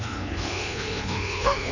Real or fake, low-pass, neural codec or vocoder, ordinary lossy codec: fake; 7.2 kHz; codec, 24 kHz, 1.2 kbps, DualCodec; none